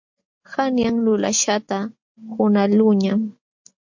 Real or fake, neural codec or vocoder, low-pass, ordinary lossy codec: real; none; 7.2 kHz; MP3, 48 kbps